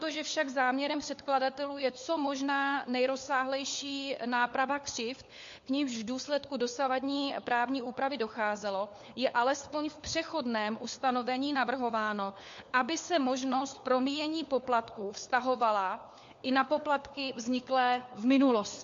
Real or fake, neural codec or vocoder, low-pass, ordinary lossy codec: fake; codec, 16 kHz, 4 kbps, FunCodec, trained on LibriTTS, 50 frames a second; 7.2 kHz; MP3, 48 kbps